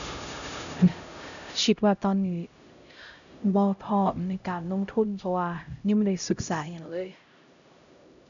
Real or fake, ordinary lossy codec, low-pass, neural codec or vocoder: fake; none; 7.2 kHz; codec, 16 kHz, 0.5 kbps, X-Codec, HuBERT features, trained on LibriSpeech